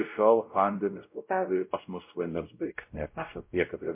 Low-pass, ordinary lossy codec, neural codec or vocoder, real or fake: 3.6 kHz; MP3, 24 kbps; codec, 16 kHz, 0.5 kbps, X-Codec, WavLM features, trained on Multilingual LibriSpeech; fake